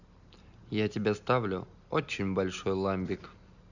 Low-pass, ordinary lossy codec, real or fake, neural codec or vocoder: 7.2 kHz; none; real; none